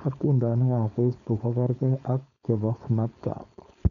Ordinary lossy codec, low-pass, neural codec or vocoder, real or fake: none; 7.2 kHz; codec, 16 kHz, 4.8 kbps, FACodec; fake